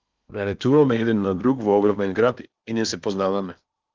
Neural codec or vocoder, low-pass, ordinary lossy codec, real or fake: codec, 16 kHz in and 24 kHz out, 0.8 kbps, FocalCodec, streaming, 65536 codes; 7.2 kHz; Opus, 32 kbps; fake